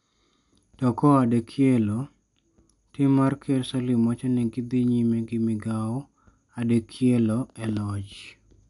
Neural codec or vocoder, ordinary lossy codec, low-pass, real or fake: none; none; 10.8 kHz; real